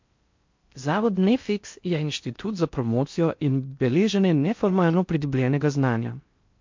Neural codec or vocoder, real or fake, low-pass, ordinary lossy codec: codec, 16 kHz in and 24 kHz out, 0.6 kbps, FocalCodec, streaming, 4096 codes; fake; 7.2 kHz; MP3, 48 kbps